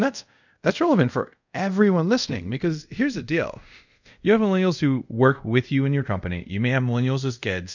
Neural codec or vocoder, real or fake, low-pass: codec, 24 kHz, 0.5 kbps, DualCodec; fake; 7.2 kHz